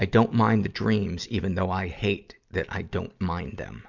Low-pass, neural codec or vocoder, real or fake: 7.2 kHz; none; real